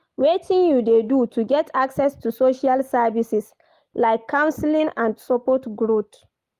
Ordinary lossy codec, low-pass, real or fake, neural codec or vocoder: Opus, 32 kbps; 14.4 kHz; real; none